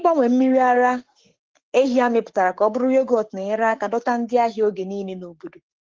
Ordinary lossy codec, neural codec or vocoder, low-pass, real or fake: Opus, 16 kbps; codec, 44.1 kHz, 7.8 kbps, Pupu-Codec; 7.2 kHz; fake